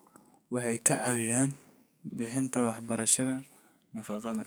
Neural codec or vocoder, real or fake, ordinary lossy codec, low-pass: codec, 44.1 kHz, 2.6 kbps, SNAC; fake; none; none